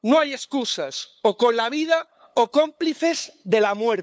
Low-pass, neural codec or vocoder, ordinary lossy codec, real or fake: none; codec, 16 kHz, 8 kbps, FunCodec, trained on LibriTTS, 25 frames a second; none; fake